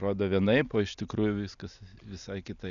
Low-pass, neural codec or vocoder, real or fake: 7.2 kHz; none; real